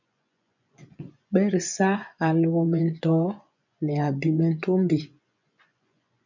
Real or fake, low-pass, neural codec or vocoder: fake; 7.2 kHz; vocoder, 24 kHz, 100 mel bands, Vocos